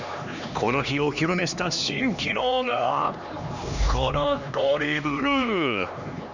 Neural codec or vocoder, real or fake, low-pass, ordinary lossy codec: codec, 16 kHz, 2 kbps, X-Codec, HuBERT features, trained on LibriSpeech; fake; 7.2 kHz; none